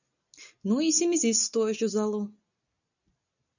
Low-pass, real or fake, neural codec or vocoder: 7.2 kHz; real; none